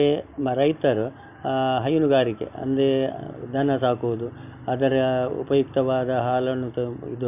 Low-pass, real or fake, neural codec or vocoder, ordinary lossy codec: 3.6 kHz; real; none; none